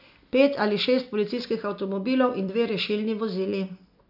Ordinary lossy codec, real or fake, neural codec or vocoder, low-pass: none; real; none; 5.4 kHz